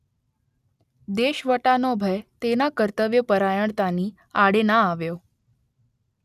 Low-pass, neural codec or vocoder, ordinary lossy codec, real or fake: 14.4 kHz; none; none; real